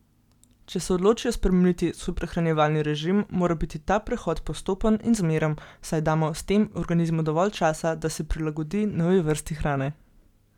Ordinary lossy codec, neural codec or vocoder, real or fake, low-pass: none; none; real; 19.8 kHz